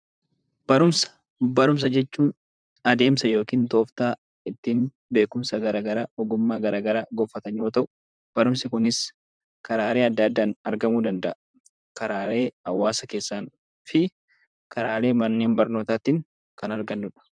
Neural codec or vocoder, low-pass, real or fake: vocoder, 44.1 kHz, 128 mel bands, Pupu-Vocoder; 9.9 kHz; fake